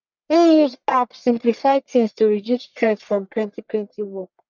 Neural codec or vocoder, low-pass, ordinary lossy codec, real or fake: codec, 44.1 kHz, 1.7 kbps, Pupu-Codec; 7.2 kHz; none; fake